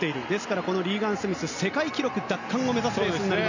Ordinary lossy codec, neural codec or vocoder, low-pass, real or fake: none; none; 7.2 kHz; real